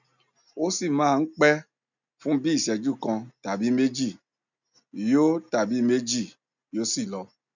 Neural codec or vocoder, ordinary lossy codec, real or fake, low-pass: none; none; real; 7.2 kHz